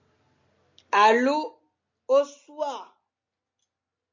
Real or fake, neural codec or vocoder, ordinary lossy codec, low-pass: real; none; MP3, 48 kbps; 7.2 kHz